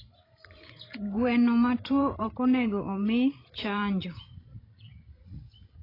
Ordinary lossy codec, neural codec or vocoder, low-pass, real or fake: AAC, 24 kbps; codec, 16 kHz, 16 kbps, FreqCodec, larger model; 5.4 kHz; fake